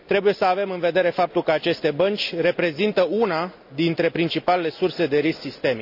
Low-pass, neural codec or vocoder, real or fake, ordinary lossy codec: 5.4 kHz; none; real; none